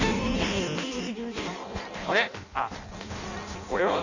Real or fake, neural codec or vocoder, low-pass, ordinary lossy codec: fake; codec, 16 kHz in and 24 kHz out, 0.6 kbps, FireRedTTS-2 codec; 7.2 kHz; none